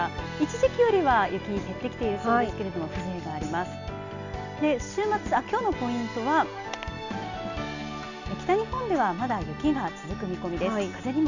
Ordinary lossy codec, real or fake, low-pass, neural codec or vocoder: none; real; 7.2 kHz; none